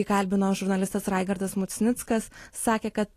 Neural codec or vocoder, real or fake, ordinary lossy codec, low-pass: none; real; AAC, 48 kbps; 14.4 kHz